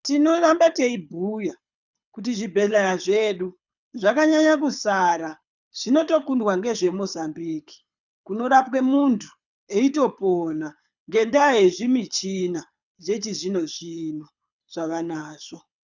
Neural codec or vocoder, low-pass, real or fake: codec, 24 kHz, 6 kbps, HILCodec; 7.2 kHz; fake